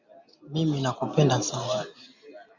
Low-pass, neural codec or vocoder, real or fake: 7.2 kHz; none; real